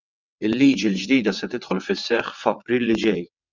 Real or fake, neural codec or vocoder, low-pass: fake; vocoder, 22.05 kHz, 80 mel bands, WaveNeXt; 7.2 kHz